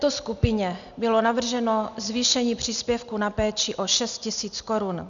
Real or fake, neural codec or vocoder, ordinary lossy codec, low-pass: real; none; MP3, 96 kbps; 7.2 kHz